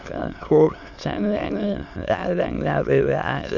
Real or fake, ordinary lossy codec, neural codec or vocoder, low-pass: fake; none; autoencoder, 22.05 kHz, a latent of 192 numbers a frame, VITS, trained on many speakers; 7.2 kHz